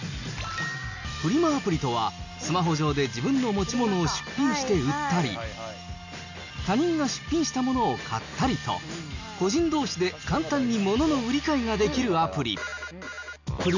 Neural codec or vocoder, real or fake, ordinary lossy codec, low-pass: none; real; none; 7.2 kHz